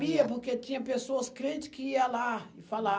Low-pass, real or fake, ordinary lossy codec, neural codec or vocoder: none; real; none; none